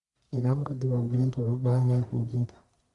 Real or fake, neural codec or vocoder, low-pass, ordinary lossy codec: fake; codec, 44.1 kHz, 1.7 kbps, Pupu-Codec; 10.8 kHz; Opus, 64 kbps